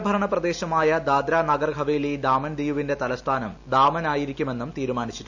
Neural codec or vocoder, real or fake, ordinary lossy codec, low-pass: none; real; none; 7.2 kHz